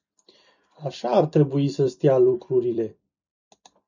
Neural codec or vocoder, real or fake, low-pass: vocoder, 44.1 kHz, 128 mel bands every 256 samples, BigVGAN v2; fake; 7.2 kHz